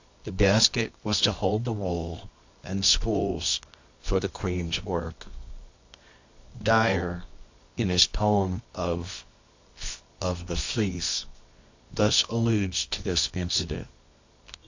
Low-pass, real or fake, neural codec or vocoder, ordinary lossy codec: 7.2 kHz; fake; codec, 24 kHz, 0.9 kbps, WavTokenizer, medium music audio release; AAC, 48 kbps